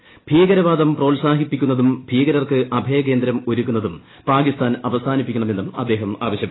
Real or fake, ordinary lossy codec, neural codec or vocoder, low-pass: real; AAC, 16 kbps; none; 7.2 kHz